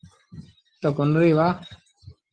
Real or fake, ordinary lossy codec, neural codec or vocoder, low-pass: real; Opus, 16 kbps; none; 9.9 kHz